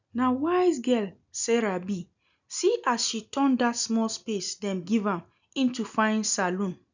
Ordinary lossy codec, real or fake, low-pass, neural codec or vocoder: none; real; 7.2 kHz; none